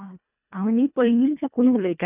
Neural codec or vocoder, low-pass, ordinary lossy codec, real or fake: codec, 24 kHz, 1.5 kbps, HILCodec; 3.6 kHz; none; fake